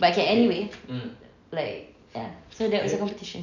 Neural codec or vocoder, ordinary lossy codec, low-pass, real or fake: none; none; 7.2 kHz; real